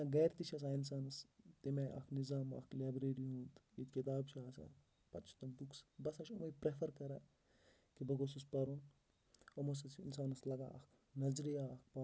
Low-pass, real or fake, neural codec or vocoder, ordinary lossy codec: none; real; none; none